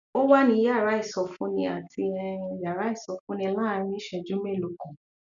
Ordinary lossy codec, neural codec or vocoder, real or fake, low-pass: none; none; real; 7.2 kHz